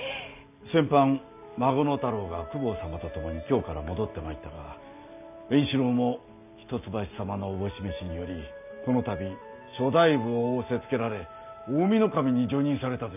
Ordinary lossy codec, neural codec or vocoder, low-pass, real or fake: none; none; 3.6 kHz; real